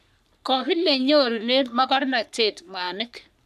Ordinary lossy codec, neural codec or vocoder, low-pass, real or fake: none; codec, 44.1 kHz, 3.4 kbps, Pupu-Codec; 14.4 kHz; fake